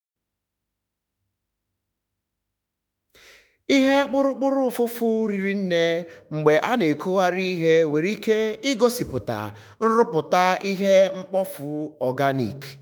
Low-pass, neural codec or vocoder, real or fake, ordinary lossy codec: none; autoencoder, 48 kHz, 32 numbers a frame, DAC-VAE, trained on Japanese speech; fake; none